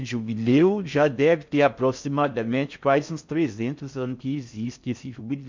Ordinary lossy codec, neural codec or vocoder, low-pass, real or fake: MP3, 64 kbps; codec, 16 kHz in and 24 kHz out, 0.6 kbps, FocalCodec, streaming, 4096 codes; 7.2 kHz; fake